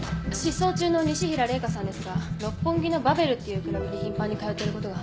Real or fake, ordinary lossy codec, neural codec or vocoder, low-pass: real; none; none; none